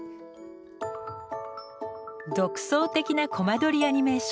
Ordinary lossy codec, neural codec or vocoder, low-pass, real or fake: none; none; none; real